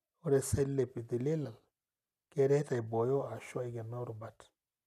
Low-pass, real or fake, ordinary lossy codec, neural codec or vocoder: 14.4 kHz; real; none; none